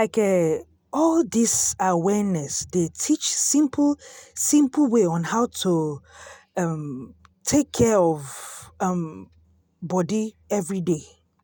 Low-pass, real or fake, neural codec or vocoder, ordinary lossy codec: none; real; none; none